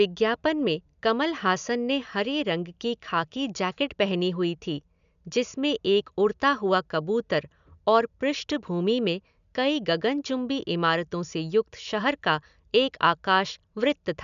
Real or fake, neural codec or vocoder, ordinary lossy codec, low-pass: real; none; MP3, 96 kbps; 7.2 kHz